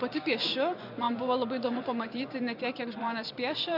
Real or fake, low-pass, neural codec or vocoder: fake; 5.4 kHz; vocoder, 24 kHz, 100 mel bands, Vocos